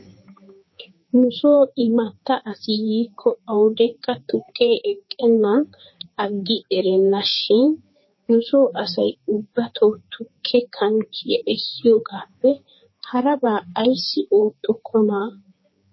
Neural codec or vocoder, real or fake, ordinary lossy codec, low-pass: codec, 16 kHz, 4 kbps, X-Codec, HuBERT features, trained on general audio; fake; MP3, 24 kbps; 7.2 kHz